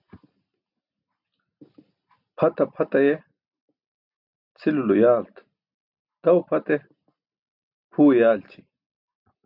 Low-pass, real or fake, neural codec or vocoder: 5.4 kHz; real; none